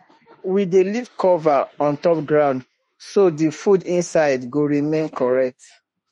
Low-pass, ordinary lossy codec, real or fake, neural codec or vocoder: 19.8 kHz; MP3, 48 kbps; fake; autoencoder, 48 kHz, 32 numbers a frame, DAC-VAE, trained on Japanese speech